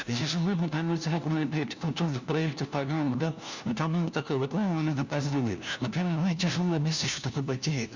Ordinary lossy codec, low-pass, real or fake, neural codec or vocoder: Opus, 64 kbps; 7.2 kHz; fake; codec, 16 kHz, 1 kbps, FunCodec, trained on LibriTTS, 50 frames a second